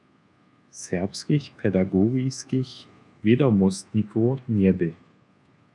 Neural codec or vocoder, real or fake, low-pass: codec, 24 kHz, 1.2 kbps, DualCodec; fake; 10.8 kHz